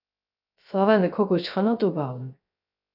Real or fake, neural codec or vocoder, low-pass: fake; codec, 16 kHz, 0.3 kbps, FocalCodec; 5.4 kHz